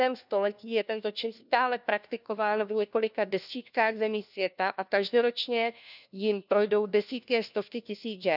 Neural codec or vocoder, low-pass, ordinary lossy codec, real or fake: codec, 16 kHz, 1 kbps, FunCodec, trained on LibriTTS, 50 frames a second; 5.4 kHz; none; fake